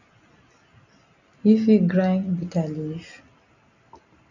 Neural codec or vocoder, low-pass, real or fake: none; 7.2 kHz; real